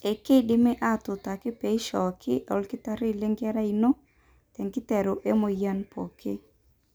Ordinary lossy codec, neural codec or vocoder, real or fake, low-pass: none; none; real; none